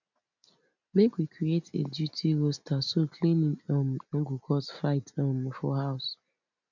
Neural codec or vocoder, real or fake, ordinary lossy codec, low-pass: none; real; none; 7.2 kHz